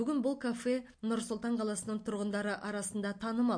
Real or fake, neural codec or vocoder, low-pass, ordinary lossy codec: real; none; 9.9 kHz; MP3, 48 kbps